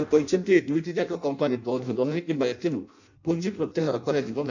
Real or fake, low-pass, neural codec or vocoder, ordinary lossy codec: fake; 7.2 kHz; codec, 16 kHz in and 24 kHz out, 0.6 kbps, FireRedTTS-2 codec; none